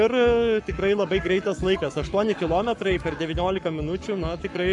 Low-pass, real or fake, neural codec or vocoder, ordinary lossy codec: 10.8 kHz; fake; codec, 44.1 kHz, 7.8 kbps, Pupu-Codec; MP3, 96 kbps